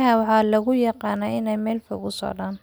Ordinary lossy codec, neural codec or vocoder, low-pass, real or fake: none; none; none; real